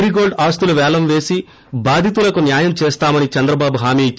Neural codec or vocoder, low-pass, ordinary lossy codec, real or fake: none; none; none; real